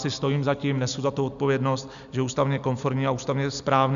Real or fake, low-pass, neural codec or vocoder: real; 7.2 kHz; none